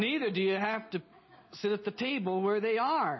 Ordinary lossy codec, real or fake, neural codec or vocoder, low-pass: MP3, 24 kbps; real; none; 7.2 kHz